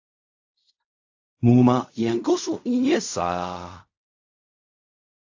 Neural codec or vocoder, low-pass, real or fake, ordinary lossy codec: codec, 16 kHz in and 24 kHz out, 0.4 kbps, LongCat-Audio-Codec, fine tuned four codebook decoder; 7.2 kHz; fake; AAC, 48 kbps